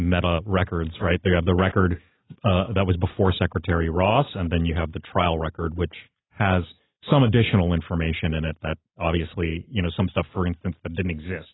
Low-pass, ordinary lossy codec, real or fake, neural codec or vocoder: 7.2 kHz; AAC, 16 kbps; real; none